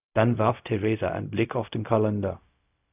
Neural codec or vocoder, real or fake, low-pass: codec, 16 kHz, 0.4 kbps, LongCat-Audio-Codec; fake; 3.6 kHz